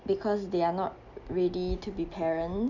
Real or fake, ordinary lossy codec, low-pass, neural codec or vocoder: real; none; 7.2 kHz; none